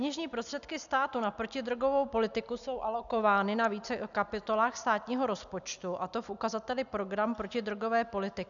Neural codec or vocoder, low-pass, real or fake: none; 7.2 kHz; real